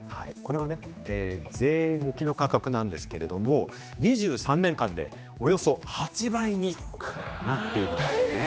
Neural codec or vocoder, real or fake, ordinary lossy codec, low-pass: codec, 16 kHz, 2 kbps, X-Codec, HuBERT features, trained on general audio; fake; none; none